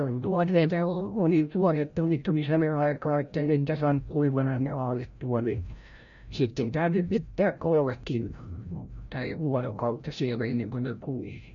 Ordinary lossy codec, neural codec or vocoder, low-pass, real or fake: none; codec, 16 kHz, 0.5 kbps, FreqCodec, larger model; 7.2 kHz; fake